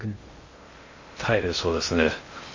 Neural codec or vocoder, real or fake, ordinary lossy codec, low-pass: codec, 16 kHz in and 24 kHz out, 0.6 kbps, FocalCodec, streaming, 2048 codes; fake; MP3, 32 kbps; 7.2 kHz